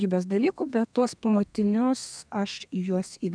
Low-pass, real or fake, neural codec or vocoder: 9.9 kHz; fake; codec, 32 kHz, 1.9 kbps, SNAC